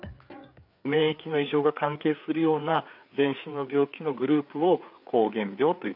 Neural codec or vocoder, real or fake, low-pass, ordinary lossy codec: codec, 16 kHz, 4 kbps, FreqCodec, larger model; fake; 5.4 kHz; none